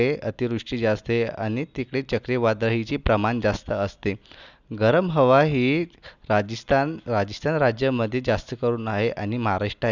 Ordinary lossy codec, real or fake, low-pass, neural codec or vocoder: none; real; 7.2 kHz; none